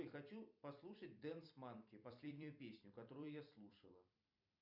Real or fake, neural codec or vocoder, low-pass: fake; vocoder, 44.1 kHz, 128 mel bands every 256 samples, BigVGAN v2; 5.4 kHz